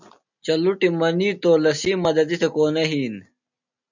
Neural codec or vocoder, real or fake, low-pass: none; real; 7.2 kHz